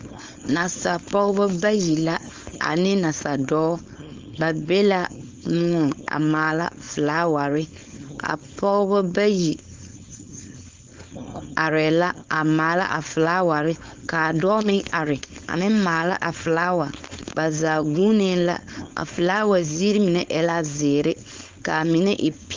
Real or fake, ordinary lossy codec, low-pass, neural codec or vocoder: fake; Opus, 32 kbps; 7.2 kHz; codec, 16 kHz, 4.8 kbps, FACodec